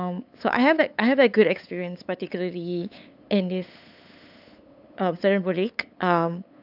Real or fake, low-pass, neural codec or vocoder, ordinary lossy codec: fake; 5.4 kHz; codec, 16 kHz, 8 kbps, FunCodec, trained on LibriTTS, 25 frames a second; AAC, 48 kbps